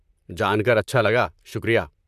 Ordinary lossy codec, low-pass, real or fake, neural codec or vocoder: none; 14.4 kHz; fake; vocoder, 44.1 kHz, 128 mel bands, Pupu-Vocoder